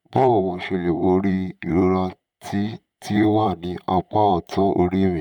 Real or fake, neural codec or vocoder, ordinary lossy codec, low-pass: fake; vocoder, 44.1 kHz, 128 mel bands, Pupu-Vocoder; none; 19.8 kHz